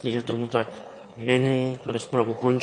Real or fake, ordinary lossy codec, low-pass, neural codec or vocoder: fake; MP3, 48 kbps; 9.9 kHz; autoencoder, 22.05 kHz, a latent of 192 numbers a frame, VITS, trained on one speaker